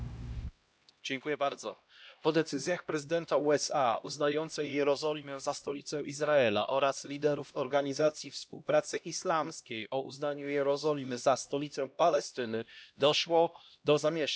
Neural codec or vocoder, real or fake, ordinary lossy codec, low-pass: codec, 16 kHz, 1 kbps, X-Codec, HuBERT features, trained on LibriSpeech; fake; none; none